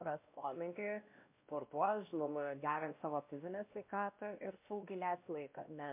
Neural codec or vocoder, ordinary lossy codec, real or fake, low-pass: codec, 16 kHz, 1 kbps, X-Codec, WavLM features, trained on Multilingual LibriSpeech; MP3, 32 kbps; fake; 3.6 kHz